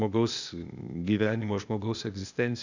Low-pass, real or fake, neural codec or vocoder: 7.2 kHz; fake; codec, 16 kHz, 0.8 kbps, ZipCodec